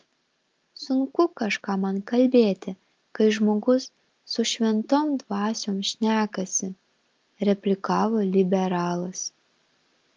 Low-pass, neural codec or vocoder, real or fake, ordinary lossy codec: 7.2 kHz; none; real; Opus, 24 kbps